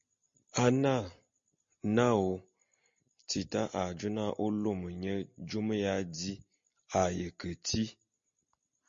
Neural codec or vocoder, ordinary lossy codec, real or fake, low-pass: none; MP3, 48 kbps; real; 7.2 kHz